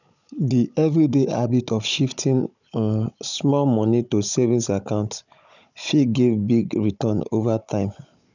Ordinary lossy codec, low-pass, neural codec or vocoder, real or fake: none; 7.2 kHz; codec, 16 kHz, 16 kbps, FunCodec, trained on Chinese and English, 50 frames a second; fake